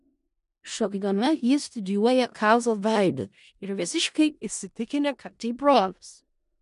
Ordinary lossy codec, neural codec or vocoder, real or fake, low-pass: MP3, 64 kbps; codec, 16 kHz in and 24 kHz out, 0.4 kbps, LongCat-Audio-Codec, four codebook decoder; fake; 10.8 kHz